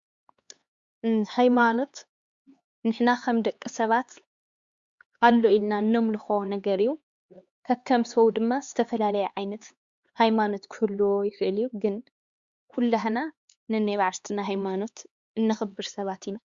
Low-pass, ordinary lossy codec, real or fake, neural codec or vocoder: 7.2 kHz; Opus, 64 kbps; fake; codec, 16 kHz, 4 kbps, X-Codec, HuBERT features, trained on LibriSpeech